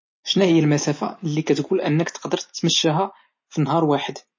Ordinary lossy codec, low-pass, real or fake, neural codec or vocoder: MP3, 32 kbps; 7.2 kHz; real; none